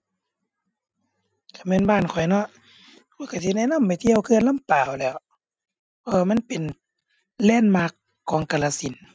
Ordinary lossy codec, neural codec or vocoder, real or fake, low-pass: none; none; real; none